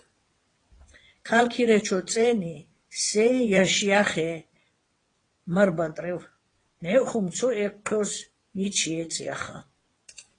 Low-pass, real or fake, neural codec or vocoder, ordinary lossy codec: 9.9 kHz; fake; vocoder, 22.05 kHz, 80 mel bands, WaveNeXt; AAC, 32 kbps